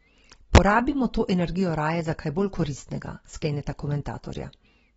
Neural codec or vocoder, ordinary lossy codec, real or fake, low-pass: none; AAC, 24 kbps; real; 19.8 kHz